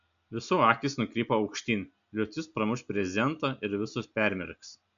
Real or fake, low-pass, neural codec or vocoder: real; 7.2 kHz; none